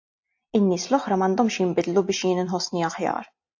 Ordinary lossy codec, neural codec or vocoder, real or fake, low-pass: AAC, 48 kbps; none; real; 7.2 kHz